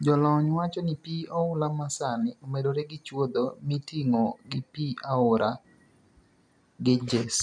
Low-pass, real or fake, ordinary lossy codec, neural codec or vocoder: 9.9 kHz; real; none; none